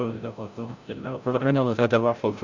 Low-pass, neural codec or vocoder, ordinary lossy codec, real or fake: 7.2 kHz; codec, 16 kHz, 0.5 kbps, FreqCodec, larger model; Opus, 64 kbps; fake